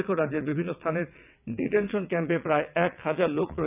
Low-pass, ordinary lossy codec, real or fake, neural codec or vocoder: 3.6 kHz; none; fake; vocoder, 22.05 kHz, 80 mel bands, WaveNeXt